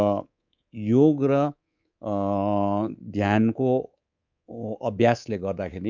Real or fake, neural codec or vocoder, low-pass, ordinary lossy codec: fake; codec, 24 kHz, 3.1 kbps, DualCodec; 7.2 kHz; none